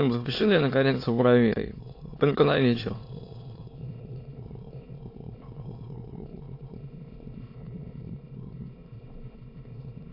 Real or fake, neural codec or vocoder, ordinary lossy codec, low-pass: fake; autoencoder, 22.05 kHz, a latent of 192 numbers a frame, VITS, trained on many speakers; AAC, 32 kbps; 5.4 kHz